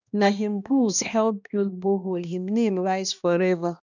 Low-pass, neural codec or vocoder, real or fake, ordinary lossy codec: 7.2 kHz; codec, 16 kHz, 1 kbps, X-Codec, HuBERT features, trained on balanced general audio; fake; none